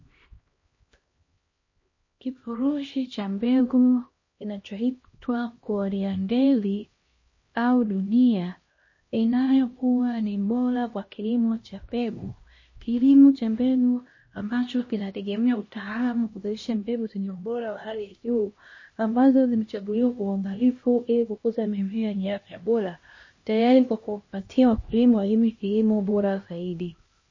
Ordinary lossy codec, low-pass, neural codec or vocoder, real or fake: MP3, 32 kbps; 7.2 kHz; codec, 16 kHz, 1 kbps, X-Codec, HuBERT features, trained on LibriSpeech; fake